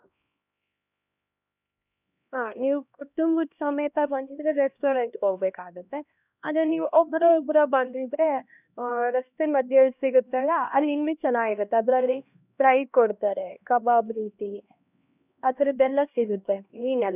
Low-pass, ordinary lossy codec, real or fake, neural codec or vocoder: 3.6 kHz; none; fake; codec, 16 kHz, 1 kbps, X-Codec, HuBERT features, trained on LibriSpeech